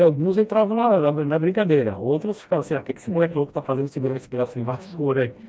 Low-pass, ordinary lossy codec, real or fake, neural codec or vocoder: none; none; fake; codec, 16 kHz, 1 kbps, FreqCodec, smaller model